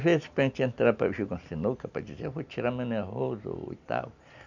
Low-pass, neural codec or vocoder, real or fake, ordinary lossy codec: 7.2 kHz; none; real; none